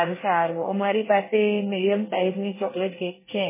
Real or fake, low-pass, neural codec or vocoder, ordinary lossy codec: fake; 3.6 kHz; codec, 24 kHz, 1 kbps, SNAC; MP3, 16 kbps